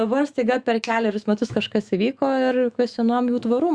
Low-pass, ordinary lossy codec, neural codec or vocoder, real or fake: 9.9 kHz; Opus, 64 kbps; autoencoder, 48 kHz, 128 numbers a frame, DAC-VAE, trained on Japanese speech; fake